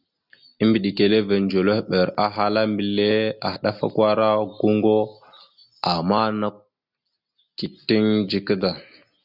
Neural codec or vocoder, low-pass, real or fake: none; 5.4 kHz; real